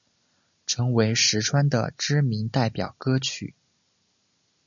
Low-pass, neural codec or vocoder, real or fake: 7.2 kHz; none; real